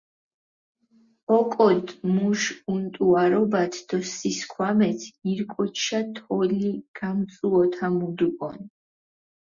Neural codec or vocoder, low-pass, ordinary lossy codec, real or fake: none; 7.2 kHz; Opus, 64 kbps; real